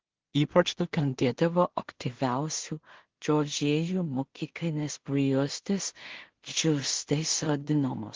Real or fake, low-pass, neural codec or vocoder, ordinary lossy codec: fake; 7.2 kHz; codec, 16 kHz in and 24 kHz out, 0.4 kbps, LongCat-Audio-Codec, two codebook decoder; Opus, 16 kbps